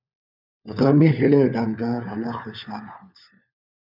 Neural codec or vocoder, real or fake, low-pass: codec, 16 kHz, 4 kbps, FunCodec, trained on LibriTTS, 50 frames a second; fake; 5.4 kHz